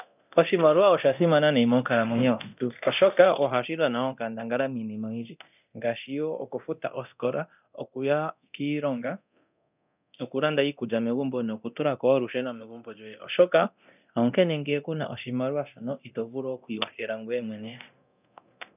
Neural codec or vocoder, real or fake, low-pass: codec, 24 kHz, 0.9 kbps, DualCodec; fake; 3.6 kHz